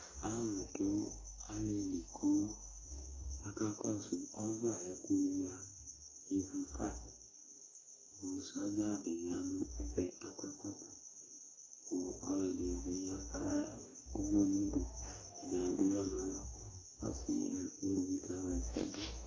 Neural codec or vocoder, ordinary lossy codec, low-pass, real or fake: codec, 44.1 kHz, 2.6 kbps, DAC; AAC, 32 kbps; 7.2 kHz; fake